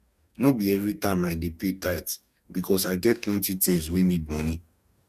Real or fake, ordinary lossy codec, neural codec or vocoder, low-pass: fake; none; codec, 44.1 kHz, 2.6 kbps, DAC; 14.4 kHz